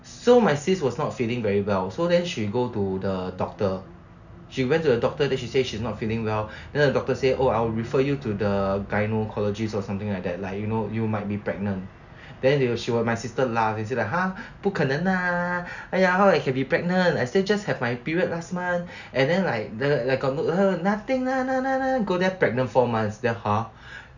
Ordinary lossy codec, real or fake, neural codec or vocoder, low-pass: none; real; none; 7.2 kHz